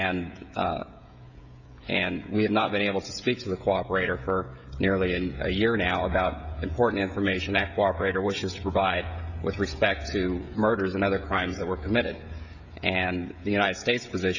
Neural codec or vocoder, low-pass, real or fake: codec, 16 kHz, 16 kbps, FreqCodec, smaller model; 7.2 kHz; fake